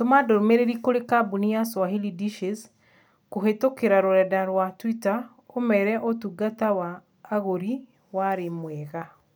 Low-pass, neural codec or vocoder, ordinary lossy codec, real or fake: none; none; none; real